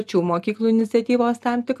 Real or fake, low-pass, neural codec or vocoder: real; 14.4 kHz; none